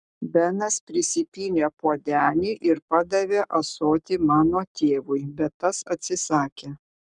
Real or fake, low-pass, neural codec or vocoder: fake; 10.8 kHz; codec, 44.1 kHz, 7.8 kbps, Pupu-Codec